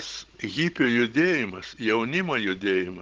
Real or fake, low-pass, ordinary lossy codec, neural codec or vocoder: fake; 7.2 kHz; Opus, 16 kbps; codec, 16 kHz, 16 kbps, FunCodec, trained on Chinese and English, 50 frames a second